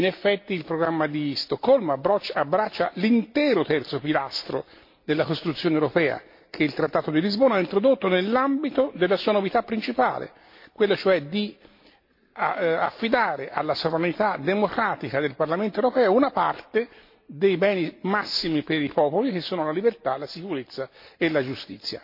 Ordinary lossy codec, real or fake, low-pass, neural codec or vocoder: MP3, 32 kbps; real; 5.4 kHz; none